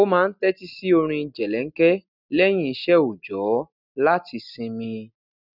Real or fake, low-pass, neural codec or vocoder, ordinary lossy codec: real; 5.4 kHz; none; none